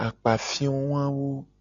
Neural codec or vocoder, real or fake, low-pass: none; real; 7.2 kHz